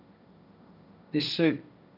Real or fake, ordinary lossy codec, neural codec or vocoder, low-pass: fake; none; codec, 16 kHz, 1.1 kbps, Voila-Tokenizer; 5.4 kHz